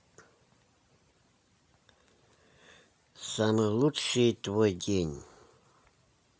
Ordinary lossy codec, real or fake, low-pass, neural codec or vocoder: none; real; none; none